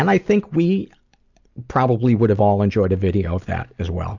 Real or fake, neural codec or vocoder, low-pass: real; none; 7.2 kHz